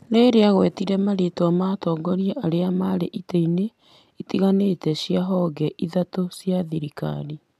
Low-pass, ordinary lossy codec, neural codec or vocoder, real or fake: 14.4 kHz; none; none; real